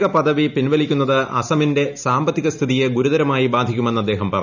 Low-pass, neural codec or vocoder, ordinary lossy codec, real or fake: 7.2 kHz; none; none; real